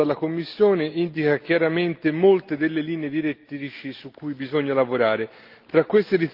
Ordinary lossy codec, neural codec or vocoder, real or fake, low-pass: Opus, 32 kbps; none; real; 5.4 kHz